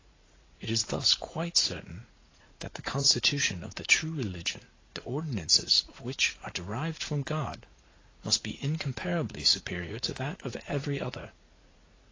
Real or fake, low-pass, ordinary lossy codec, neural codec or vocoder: fake; 7.2 kHz; AAC, 32 kbps; vocoder, 44.1 kHz, 80 mel bands, Vocos